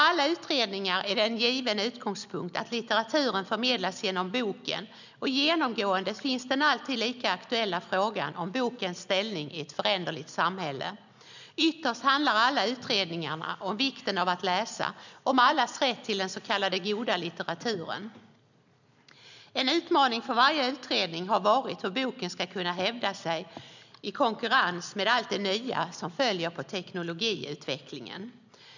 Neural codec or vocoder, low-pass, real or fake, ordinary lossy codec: none; 7.2 kHz; real; none